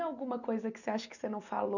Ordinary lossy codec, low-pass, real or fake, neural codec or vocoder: none; 7.2 kHz; real; none